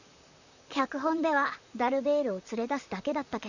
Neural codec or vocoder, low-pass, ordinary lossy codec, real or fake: vocoder, 44.1 kHz, 128 mel bands, Pupu-Vocoder; 7.2 kHz; none; fake